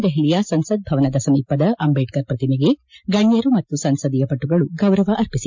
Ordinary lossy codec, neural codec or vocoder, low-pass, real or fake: MP3, 64 kbps; none; 7.2 kHz; real